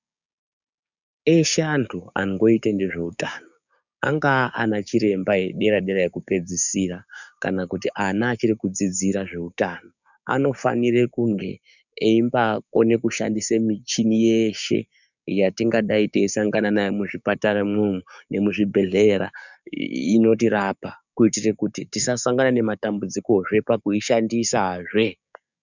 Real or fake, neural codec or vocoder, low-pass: fake; codec, 16 kHz, 6 kbps, DAC; 7.2 kHz